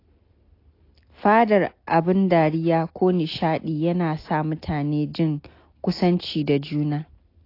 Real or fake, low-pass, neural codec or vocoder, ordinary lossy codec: real; 5.4 kHz; none; AAC, 32 kbps